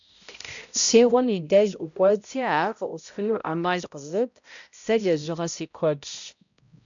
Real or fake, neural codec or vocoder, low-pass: fake; codec, 16 kHz, 0.5 kbps, X-Codec, HuBERT features, trained on balanced general audio; 7.2 kHz